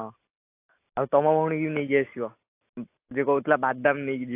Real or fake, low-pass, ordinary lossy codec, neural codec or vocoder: real; 3.6 kHz; AAC, 24 kbps; none